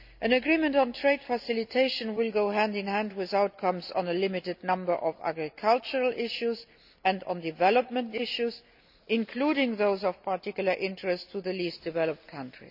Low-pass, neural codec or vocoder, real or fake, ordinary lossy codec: 5.4 kHz; none; real; none